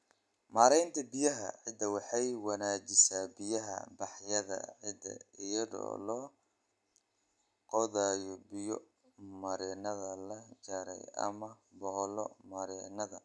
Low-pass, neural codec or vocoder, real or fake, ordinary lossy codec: none; none; real; none